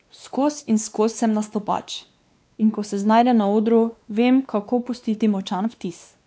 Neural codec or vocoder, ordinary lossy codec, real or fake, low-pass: codec, 16 kHz, 2 kbps, X-Codec, WavLM features, trained on Multilingual LibriSpeech; none; fake; none